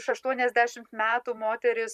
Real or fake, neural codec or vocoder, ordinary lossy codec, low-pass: fake; vocoder, 44.1 kHz, 128 mel bands every 512 samples, BigVGAN v2; Opus, 64 kbps; 14.4 kHz